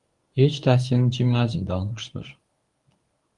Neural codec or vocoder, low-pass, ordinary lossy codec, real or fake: codec, 24 kHz, 0.9 kbps, WavTokenizer, medium speech release version 1; 10.8 kHz; Opus, 32 kbps; fake